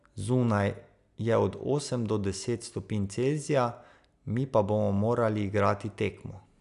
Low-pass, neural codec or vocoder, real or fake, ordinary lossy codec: 10.8 kHz; none; real; none